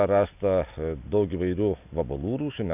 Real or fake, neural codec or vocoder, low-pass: real; none; 3.6 kHz